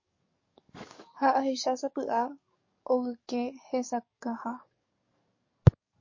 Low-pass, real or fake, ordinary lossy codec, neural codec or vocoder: 7.2 kHz; fake; MP3, 32 kbps; codec, 44.1 kHz, 7.8 kbps, DAC